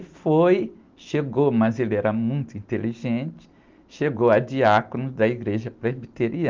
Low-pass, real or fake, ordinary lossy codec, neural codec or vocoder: 7.2 kHz; real; Opus, 32 kbps; none